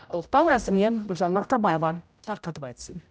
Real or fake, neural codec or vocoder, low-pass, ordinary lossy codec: fake; codec, 16 kHz, 0.5 kbps, X-Codec, HuBERT features, trained on general audio; none; none